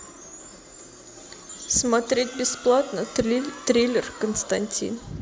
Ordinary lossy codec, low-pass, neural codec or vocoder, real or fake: none; none; none; real